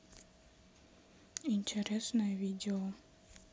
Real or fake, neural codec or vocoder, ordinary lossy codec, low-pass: real; none; none; none